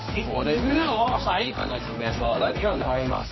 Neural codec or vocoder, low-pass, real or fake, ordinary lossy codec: codec, 24 kHz, 0.9 kbps, WavTokenizer, medium music audio release; 7.2 kHz; fake; MP3, 24 kbps